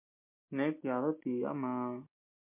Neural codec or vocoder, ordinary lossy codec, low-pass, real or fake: none; MP3, 32 kbps; 3.6 kHz; real